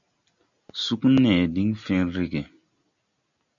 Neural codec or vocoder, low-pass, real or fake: none; 7.2 kHz; real